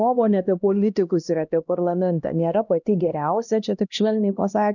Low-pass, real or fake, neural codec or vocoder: 7.2 kHz; fake; codec, 16 kHz, 1 kbps, X-Codec, HuBERT features, trained on LibriSpeech